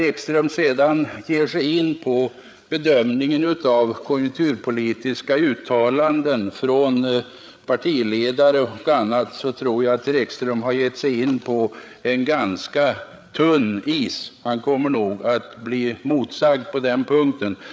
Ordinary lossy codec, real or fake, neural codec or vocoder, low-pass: none; fake; codec, 16 kHz, 8 kbps, FreqCodec, larger model; none